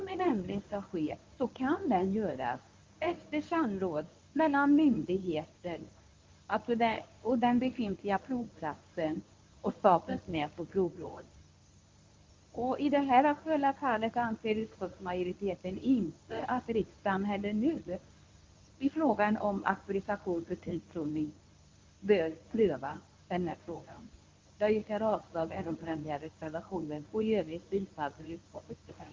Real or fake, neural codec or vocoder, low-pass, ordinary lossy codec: fake; codec, 24 kHz, 0.9 kbps, WavTokenizer, medium speech release version 1; 7.2 kHz; Opus, 32 kbps